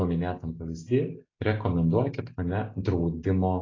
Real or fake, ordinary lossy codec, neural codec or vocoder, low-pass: real; AAC, 32 kbps; none; 7.2 kHz